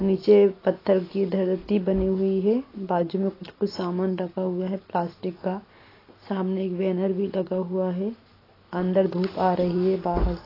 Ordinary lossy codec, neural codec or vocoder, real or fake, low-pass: AAC, 24 kbps; none; real; 5.4 kHz